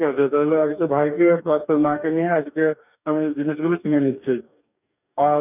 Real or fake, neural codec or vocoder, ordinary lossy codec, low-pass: fake; codec, 44.1 kHz, 2.6 kbps, DAC; none; 3.6 kHz